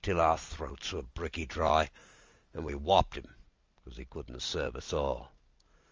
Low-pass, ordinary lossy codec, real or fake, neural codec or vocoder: 7.2 kHz; Opus, 32 kbps; real; none